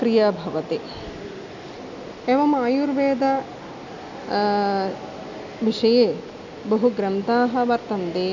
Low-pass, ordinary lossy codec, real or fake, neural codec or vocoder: 7.2 kHz; none; real; none